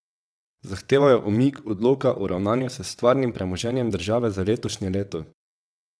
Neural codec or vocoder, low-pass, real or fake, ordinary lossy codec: vocoder, 22.05 kHz, 80 mel bands, WaveNeXt; none; fake; none